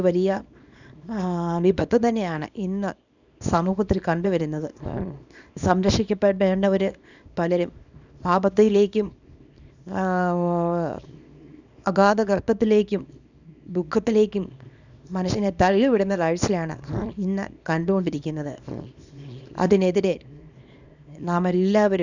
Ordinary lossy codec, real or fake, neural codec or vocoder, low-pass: none; fake; codec, 24 kHz, 0.9 kbps, WavTokenizer, small release; 7.2 kHz